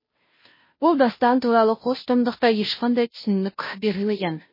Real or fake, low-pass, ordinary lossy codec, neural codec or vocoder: fake; 5.4 kHz; MP3, 24 kbps; codec, 16 kHz, 0.5 kbps, FunCodec, trained on Chinese and English, 25 frames a second